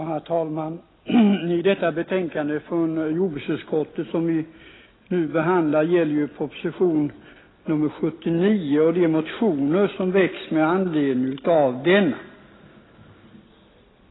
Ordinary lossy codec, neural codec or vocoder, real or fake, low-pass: AAC, 16 kbps; none; real; 7.2 kHz